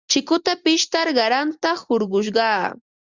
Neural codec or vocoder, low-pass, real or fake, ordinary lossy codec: none; 7.2 kHz; real; Opus, 64 kbps